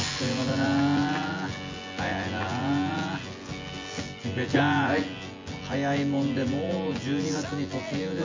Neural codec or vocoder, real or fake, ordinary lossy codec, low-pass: vocoder, 24 kHz, 100 mel bands, Vocos; fake; MP3, 48 kbps; 7.2 kHz